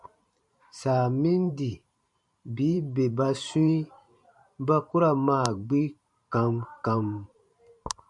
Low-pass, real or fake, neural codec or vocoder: 10.8 kHz; fake; vocoder, 44.1 kHz, 128 mel bands every 512 samples, BigVGAN v2